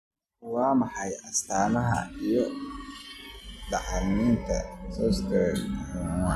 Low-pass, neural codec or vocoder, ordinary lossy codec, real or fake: 14.4 kHz; none; none; real